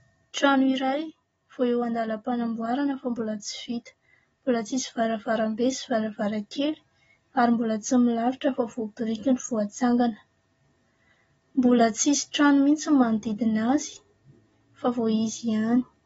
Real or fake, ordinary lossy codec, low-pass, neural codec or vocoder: real; AAC, 24 kbps; 10.8 kHz; none